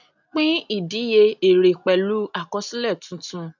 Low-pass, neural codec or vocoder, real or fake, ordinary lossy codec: 7.2 kHz; none; real; none